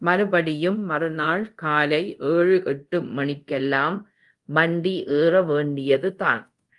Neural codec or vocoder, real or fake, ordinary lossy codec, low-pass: codec, 24 kHz, 0.5 kbps, DualCodec; fake; Opus, 24 kbps; 10.8 kHz